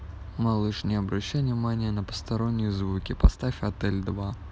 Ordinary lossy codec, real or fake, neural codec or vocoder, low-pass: none; real; none; none